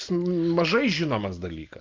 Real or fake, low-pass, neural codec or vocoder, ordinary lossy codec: real; 7.2 kHz; none; Opus, 24 kbps